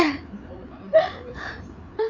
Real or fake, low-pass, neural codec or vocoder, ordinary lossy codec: fake; 7.2 kHz; codec, 16 kHz, 4 kbps, FreqCodec, larger model; none